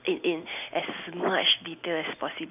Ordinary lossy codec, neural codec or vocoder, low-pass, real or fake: none; none; 3.6 kHz; real